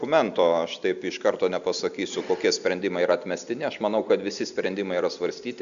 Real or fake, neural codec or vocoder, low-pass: real; none; 7.2 kHz